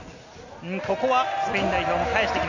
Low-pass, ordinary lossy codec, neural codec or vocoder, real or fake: 7.2 kHz; none; none; real